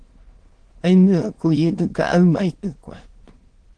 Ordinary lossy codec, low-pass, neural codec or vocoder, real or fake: Opus, 16 kbps; 9.9 kHz; autoencoder, 22.05 kHz, a latent of 192 numbers a frame, VITS, trained on many speakers; fake